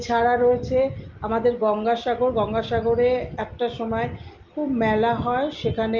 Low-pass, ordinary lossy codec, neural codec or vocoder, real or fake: 7.2 kHz; Opus, 32 kbps; none; real